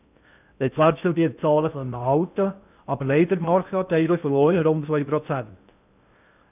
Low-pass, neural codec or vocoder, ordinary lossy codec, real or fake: 3.6 kHz; codec, 16 kHz in and 24 kHz out, 0.6 kbps, FocalCodec, streaming, 2048 codes; none; fake